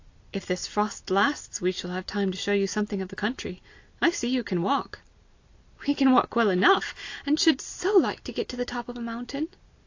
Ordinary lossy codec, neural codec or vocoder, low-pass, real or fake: AAC, 48 kbps; none; 7.2 kHz; real